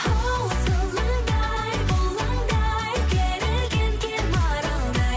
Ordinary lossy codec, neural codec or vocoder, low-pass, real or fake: none; none; none; real